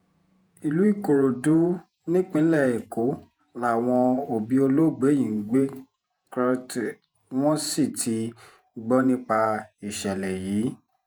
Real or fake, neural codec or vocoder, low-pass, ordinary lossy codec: fake; vocoder, 48 kHz, 128 mel bands, Vocos; none; none